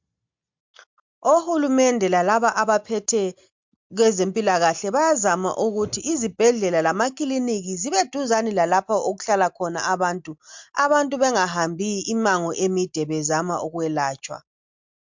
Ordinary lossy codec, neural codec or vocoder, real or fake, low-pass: MP3, 64 kbps; none; real; 7.2 kHz